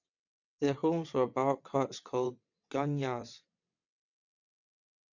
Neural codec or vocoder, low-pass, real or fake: vocoder, 22.05 kHz, 80 mel bands, WaveNeXt; 7.2 kHz; fake